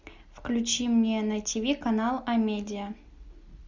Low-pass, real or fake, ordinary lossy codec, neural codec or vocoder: 7.2 kHz; real; Opus, 64 kbps; none